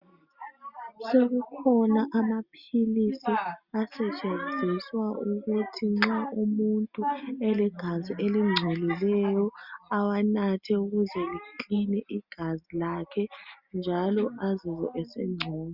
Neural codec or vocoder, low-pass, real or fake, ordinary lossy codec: none; 5.4 kHz; real; Opus, 64 kbps